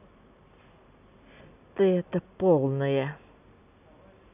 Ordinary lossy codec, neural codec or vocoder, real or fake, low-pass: none; none; real; 3.6 kHz